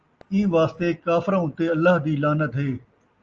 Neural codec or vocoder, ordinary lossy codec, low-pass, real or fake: none; Opus, 24 kbps; 7.2 kHz; real